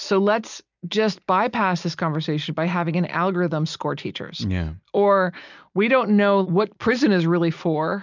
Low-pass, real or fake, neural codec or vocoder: 7.2 kHz; real; none